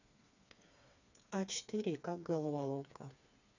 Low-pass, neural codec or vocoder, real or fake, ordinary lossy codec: 7.2 kHz; codec, 16 kHz, 4 kbps, FreqCodec, smaller model; fake; none